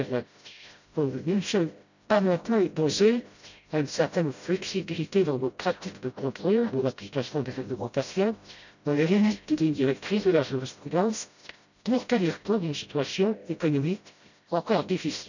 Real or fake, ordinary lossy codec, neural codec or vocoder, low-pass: fake; none; codec, 16 kHz, 0.5 kbps, FreqCodec, smaller model; 7.2 kHz